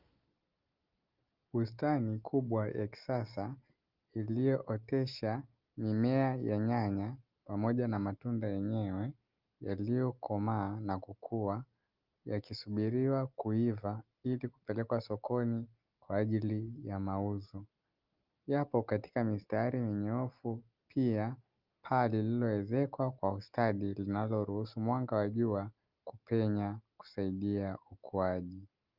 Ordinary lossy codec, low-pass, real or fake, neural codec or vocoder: Opus, 24 kbps; 5.4 kHz; real; none